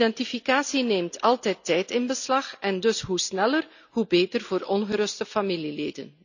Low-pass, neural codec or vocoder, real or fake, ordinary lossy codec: 7.2 kHz; none; real; none